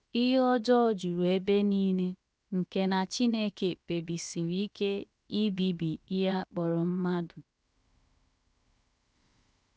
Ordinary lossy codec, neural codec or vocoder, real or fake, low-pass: none; codec, 16 kHz, 0.7 kbps, FocalCodec; fake; none